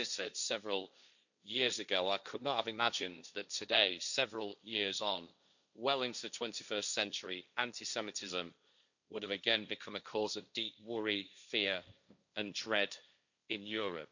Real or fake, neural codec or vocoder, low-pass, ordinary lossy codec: fake; codec, 16 kHz, 1.1 kbps, Voila-Tokenizer; 7.2 kHz; none